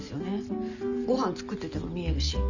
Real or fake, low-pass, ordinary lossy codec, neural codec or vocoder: real; 7.2 kHz; none; none